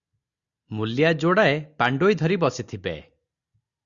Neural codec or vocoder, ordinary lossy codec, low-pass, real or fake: none; Opus, 64 kbps; 7.2 kHz; real